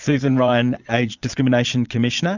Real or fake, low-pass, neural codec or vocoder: fake; 7.2 kHz; vocoder, 22.05 kHz, 80 mel bands, Vocos